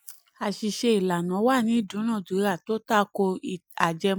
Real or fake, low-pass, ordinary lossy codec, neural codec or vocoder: real; none; none; none